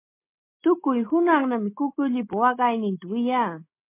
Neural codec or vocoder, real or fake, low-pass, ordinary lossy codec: none; real; 3.6 kHz; MP3, 24 kbps